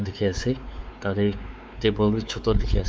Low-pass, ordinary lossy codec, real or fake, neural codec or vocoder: none; none; fake; codec, 16 kHz, 4 kbps, X-Codec, WavLM features, trained on Multilingual LibriSpeech